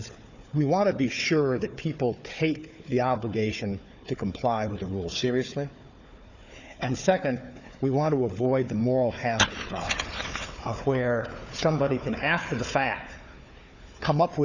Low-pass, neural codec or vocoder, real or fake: 7.2 kHz; codec, 16 kHz, 4 kbps, FunCodec, trained on Chinese and English, 50 frames a second; fake